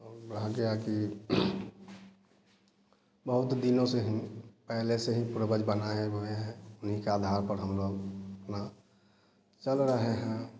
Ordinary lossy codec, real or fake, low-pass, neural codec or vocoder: none; real; none; none